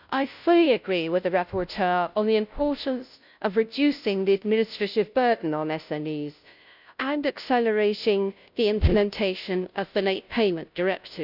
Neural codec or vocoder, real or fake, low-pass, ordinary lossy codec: codec, 16 kHz, 0.5 kbps, FunCodec, trained on Chinese and English, 25 frames a second; fake; 5.4 kHz; none